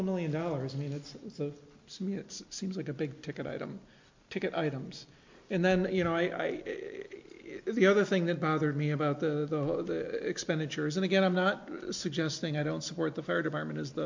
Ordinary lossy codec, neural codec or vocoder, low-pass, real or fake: MP3, 64 kbps; none; 7.2 kHz; real